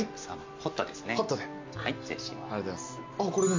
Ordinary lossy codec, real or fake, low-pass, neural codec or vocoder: MP3, 48 kbps; real; 7.2 kHz; none